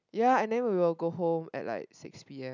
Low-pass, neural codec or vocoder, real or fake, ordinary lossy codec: 7.2 kHz; none; real; none